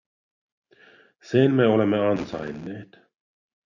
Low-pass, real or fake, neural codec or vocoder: 7.2 kHz; real; none